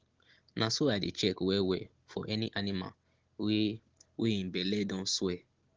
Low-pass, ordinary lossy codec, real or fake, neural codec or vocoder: 7.2 kHz; Opus, 16 kbps; real; none